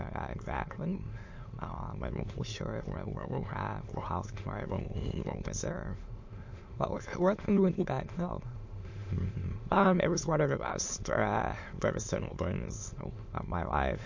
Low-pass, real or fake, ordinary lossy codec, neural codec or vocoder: 7.2 kHz; fake; MP3, 48 kbps; autoencoder, 22.05 kHz, a latent of 192 numbers a frame, VITS, trained on many speakers